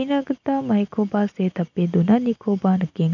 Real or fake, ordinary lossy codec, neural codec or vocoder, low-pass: real; none; none; 7.2 kHz